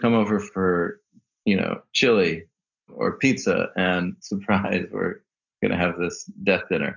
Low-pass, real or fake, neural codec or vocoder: 7.2 kHz; real; none